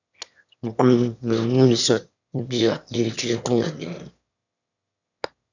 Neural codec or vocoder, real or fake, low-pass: autoencoder, 22.05 kHz, a latent of 192 numbers a frame, VITS, trained on one speaker; fake; 7.2 kHz